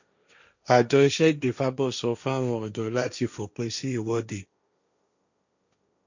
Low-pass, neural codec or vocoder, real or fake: 7.2 kHz; codec, 16 kHz, 1.1 kbps, Voila-Tokenizer; fake